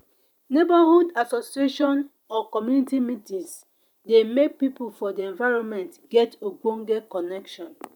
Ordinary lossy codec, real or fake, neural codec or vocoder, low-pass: none; fake; vocoder, 44.1 kHz, 128 mel bands every 512 samples, BigVGAN v2; 19.8 kHz